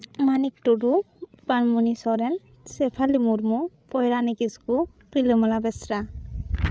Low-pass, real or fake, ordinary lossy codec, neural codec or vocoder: none; fake; none; codec, 16 kHz, 8 kbps, FreqCodec, larger model